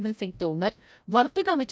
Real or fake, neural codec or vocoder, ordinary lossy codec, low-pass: fake; codec, 16 kHz, 0.5 kbps, FreqCodec, larger model; none; none